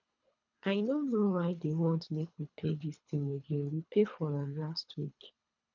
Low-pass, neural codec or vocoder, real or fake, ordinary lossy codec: 7.2 kHz; codec, 24 kHz, 3 kbps, HILCodec; fake; none